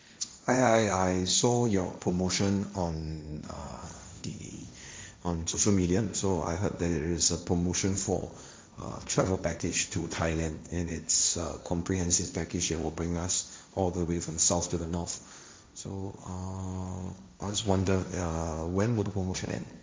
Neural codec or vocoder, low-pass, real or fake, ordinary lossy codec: codec, 16 kHz, 1.1 kbps, Voila-Tokenizer; none; fake; none